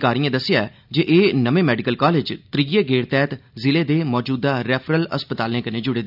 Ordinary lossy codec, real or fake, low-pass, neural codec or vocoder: none; real; 5.4 kHz; none